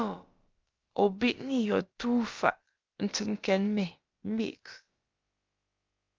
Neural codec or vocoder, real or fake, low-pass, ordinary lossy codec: codec, 16 kHz, about 1 kbps, DyCAST, with the encoder's durations; fake; 7.2 kHz; Opus, 24 kbps